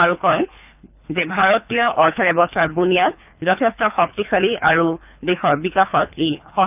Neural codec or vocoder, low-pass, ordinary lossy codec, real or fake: codec, 24 kHz, 3 kbps, HILCodec; 3.6 kHz; none; fake